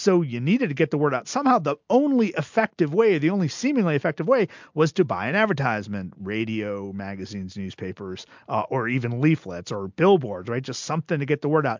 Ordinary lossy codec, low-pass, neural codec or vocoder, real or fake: MP3, 64 kbps; 7.2 kHz; none; real